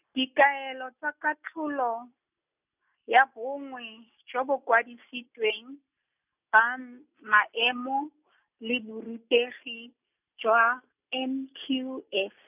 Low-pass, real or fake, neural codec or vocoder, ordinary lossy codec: 3.6 kHz; real; none; none